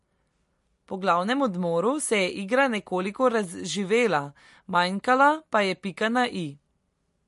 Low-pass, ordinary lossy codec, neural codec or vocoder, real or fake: 14.4 kHz; MP3, 48 kbps; none; real